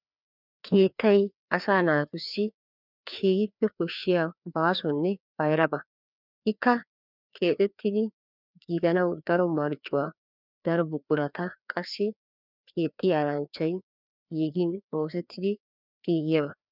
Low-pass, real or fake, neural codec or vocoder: 5.4 kHz; fake; codec, 16 kHz, 2 kbps, FreqCodec, larger model